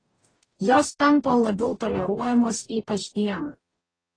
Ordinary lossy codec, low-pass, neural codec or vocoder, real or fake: AAC, 32 kbps; 9.9 kHz; codec, 44.1 kHz, 0.9 kbps, DAC; fake